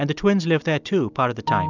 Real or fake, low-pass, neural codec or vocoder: real; 7.2 kHz; none